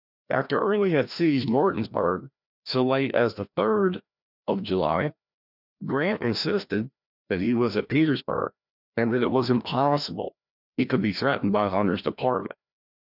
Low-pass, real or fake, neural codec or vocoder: 5.4 kHz; fake; codec, 16 kHz, 1 kbps, FreqCodec, larger model